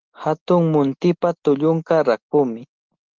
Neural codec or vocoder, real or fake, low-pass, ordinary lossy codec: none; real; 7.2 kHz; Opus, 16 kbps